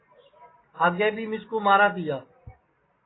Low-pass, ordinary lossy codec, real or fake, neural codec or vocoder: 7.2 kHz; AAC, 16 kbps; real; none